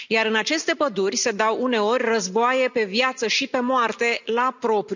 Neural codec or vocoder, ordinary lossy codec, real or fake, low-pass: none; none; real; 7.2 kHz